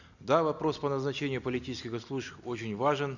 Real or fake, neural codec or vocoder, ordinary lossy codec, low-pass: real; none; none; 7.2 kHz